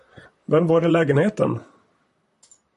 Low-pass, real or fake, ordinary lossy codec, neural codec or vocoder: 14.4 kHz; fake; MP3, 48 kbps; vocoder, 44.1 kHz, 128 mel bands, Pupu-Vocoder